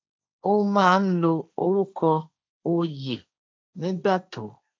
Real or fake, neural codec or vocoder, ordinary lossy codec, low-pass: fake; codec, 16 kHz, 1.1 kbps, Voila-Tokenizer; none; none